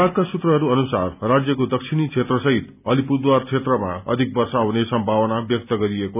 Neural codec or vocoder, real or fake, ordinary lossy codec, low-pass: none; real; none; 3.6 kHz